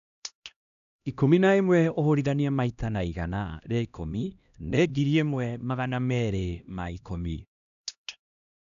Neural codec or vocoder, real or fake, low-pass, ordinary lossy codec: codec, 16 kHz, 1 kbps, X-Codec, HuBERT features, trained on LibriSpeech; fake; 7.2 kHz; none